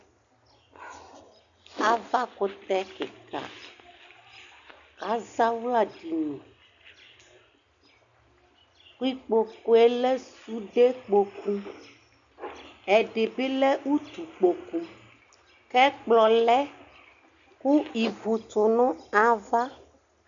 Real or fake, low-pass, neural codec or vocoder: real; 7.2 kHz; none